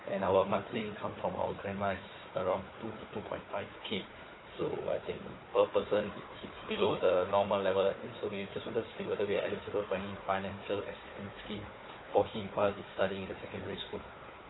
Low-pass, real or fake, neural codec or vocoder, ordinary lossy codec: 7.2 kHz; fake; codec, 16 kHz, 4 kbps, FunCodec, trained on Chinese and English, 50 frames a second; AAC, 16 kbps